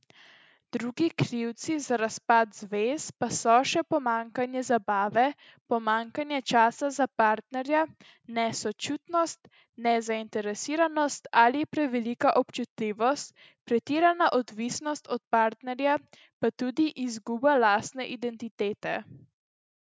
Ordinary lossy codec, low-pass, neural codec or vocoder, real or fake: none; none; none; real